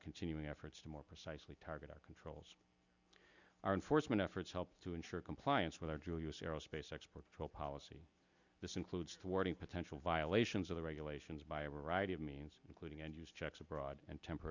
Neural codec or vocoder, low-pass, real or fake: none; 7.2 kHz; real